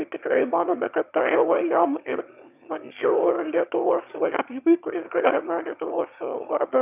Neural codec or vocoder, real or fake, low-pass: autoencoder, 22.05 kHz, a latent of 192 numbers a frame, VITS, trained on one speaker; fake; 3.6 kHz